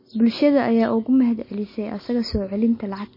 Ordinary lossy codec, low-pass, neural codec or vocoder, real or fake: MP3, 24 kbps; 5.4 kHz; none; real